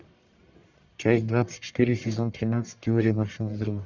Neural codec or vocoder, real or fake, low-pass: codec, 44.1 kHz, 1.7 kbps, Pupu-Codec; fake; 7.2 kHz